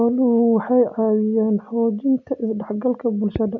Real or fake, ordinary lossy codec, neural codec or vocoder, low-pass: real; none; none; 7.2 kHz